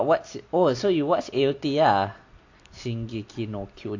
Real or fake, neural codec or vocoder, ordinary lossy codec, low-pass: real; none; AAC, 48 kbps; 7.2 kHz